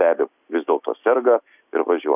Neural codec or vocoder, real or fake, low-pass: none; real; 3.6 kHz